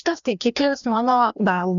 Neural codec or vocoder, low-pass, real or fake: codec, 16 kHz, 1 kbps, FreqCodec, larger model; 7.2 kHz; fake